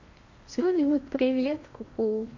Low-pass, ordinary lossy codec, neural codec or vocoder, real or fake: 7.2 kHz; MP3, 48 kbps; codec, 16 kHz in and 24 kHz out, 0.8 kbps, FocalCodec, streaming, 65536 codes; fake